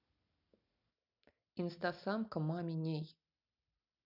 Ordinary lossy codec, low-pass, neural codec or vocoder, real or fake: none; 5.4 kHz; none; real